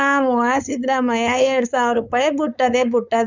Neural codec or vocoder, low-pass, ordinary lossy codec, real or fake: codec, 16 kHz, 4.8 kbps, FACodec; 7.2 kHz; none; fake